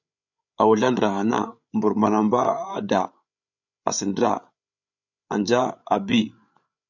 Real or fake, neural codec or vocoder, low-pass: fake; codec, 16 kHz, 8 kbps, FreqCodec, larger model; 7.2 kHz